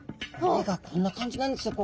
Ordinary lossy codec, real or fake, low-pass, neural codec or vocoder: none; real; none; none